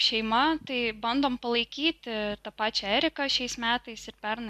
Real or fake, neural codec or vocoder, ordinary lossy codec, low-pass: real; none; MP3, 96 kbps; 14.4 kHz